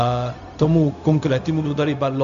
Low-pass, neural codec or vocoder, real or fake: 7.2 kHz; codec, 16 kHz, 0.4 kbps, LongCat-Audio-Codec; fake